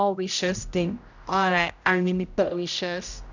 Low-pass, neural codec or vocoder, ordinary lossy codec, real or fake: 7.2 kHz; codec, 16 kHz, 0.5 kbps, X-Codec, HuBERT features, trained on general audio; none; fake